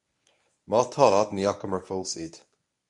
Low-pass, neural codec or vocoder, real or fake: 10.8 kHz; codec, 24 kHz, 0.9 kbps, WavTokenizer, medium speech release version 1; fake